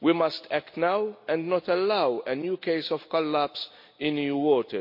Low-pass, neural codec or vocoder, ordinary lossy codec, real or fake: 5.4 kHz; none; MP3, 32 kbps; real